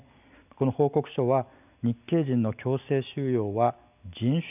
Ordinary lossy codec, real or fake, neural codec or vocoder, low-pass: none; real; none; 3.6 kHz